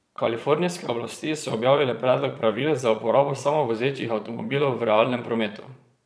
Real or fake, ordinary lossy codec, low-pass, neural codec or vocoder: fake; none; none; vocoder, 22.05 kHz, 80 mel bands, Vocos